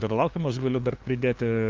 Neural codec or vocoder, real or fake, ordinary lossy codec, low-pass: codec, 16 kHz, 2 kbps, FunCodec, trained on LibriTTS, 25 frames a second; fake; Opus, 24 kbps; 7.2 kHz